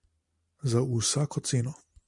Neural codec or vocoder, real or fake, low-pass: none; real; 10.8 kHz